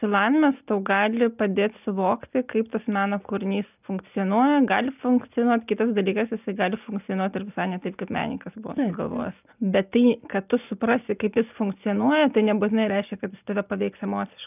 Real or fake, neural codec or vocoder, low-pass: real; none; 3.6 kHz